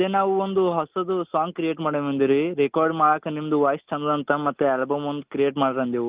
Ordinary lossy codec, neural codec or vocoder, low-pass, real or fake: Opus, 32 kbps; none; 3.6 kHz; real